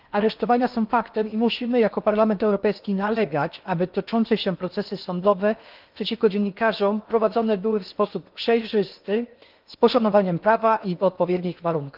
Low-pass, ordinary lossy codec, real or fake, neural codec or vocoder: 5.4 kHz; Opus, 24 kbps; fake; codec, 16 kHz in and 24 kHz out, 0.8 kbps, FocalCodec, streaming, 65536 codes